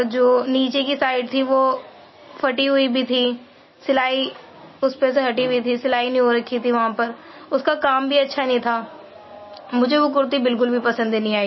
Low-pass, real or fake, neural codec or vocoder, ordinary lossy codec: 7.2 kHz; real; none; MP3, 24 kbps